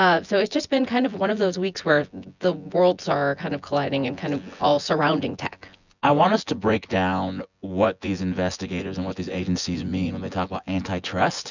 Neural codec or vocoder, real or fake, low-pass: vocoder, 24 kHz, 100 mel bands, Vocos; fake; 7.2 kHz